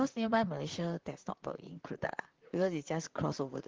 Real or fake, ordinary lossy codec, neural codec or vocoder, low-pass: fake; Opus, 16 kbps; vocoder, 44.1 kHz, 128 mel bands, Pupu-Vocoder; 7.2 kHz